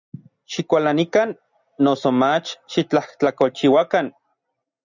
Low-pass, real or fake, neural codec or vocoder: 7.2 kHz; real; none